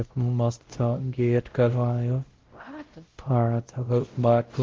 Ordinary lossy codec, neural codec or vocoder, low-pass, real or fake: Opus, 16 kbps; codec, 16 kHz, 0.5 kbps, X-Codec, WavLM features, trained on Multilingual LibriSpeech; 7.2 kHz; fake